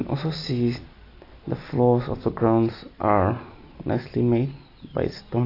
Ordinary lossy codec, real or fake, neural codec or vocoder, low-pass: AAC, 32 kbps; real; none; 5.4 kHz